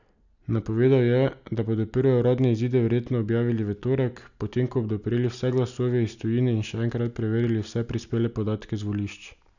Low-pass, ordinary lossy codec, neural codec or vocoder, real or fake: 7.2 kHz; none; none; real